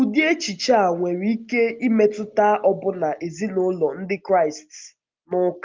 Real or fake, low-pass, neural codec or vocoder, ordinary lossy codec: real; 7.2 kHz; none; Opus, 32 kbps